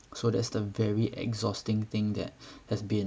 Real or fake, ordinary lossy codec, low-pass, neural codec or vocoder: real; none; none; none